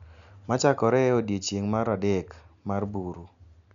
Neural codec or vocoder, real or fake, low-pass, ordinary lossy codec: none; real; 7.2 kHz; none